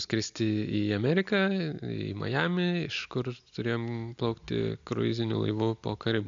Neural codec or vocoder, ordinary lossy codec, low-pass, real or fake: none; AAC, 64 kbps; 7.2 kHz; real